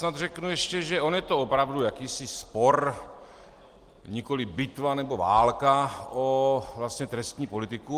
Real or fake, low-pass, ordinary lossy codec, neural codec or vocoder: real; 14.4 kHz; Opus, 24 kbps; none